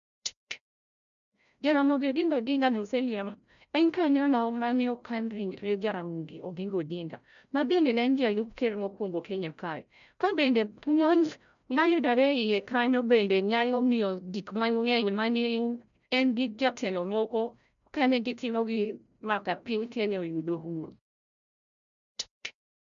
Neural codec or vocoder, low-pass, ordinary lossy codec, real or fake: codec, 16 kHz, 0.5 kbps, FreqCodec, larger model; 7.2 kHz; none; fake